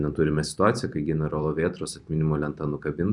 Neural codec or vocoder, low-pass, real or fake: vocoder, 44.1 kHz, 128 mel bands every 256 samples, BigVGAN v2; 10.8 kHz; fake